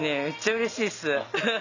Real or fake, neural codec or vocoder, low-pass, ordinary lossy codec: real; none; 7.2 kHz; none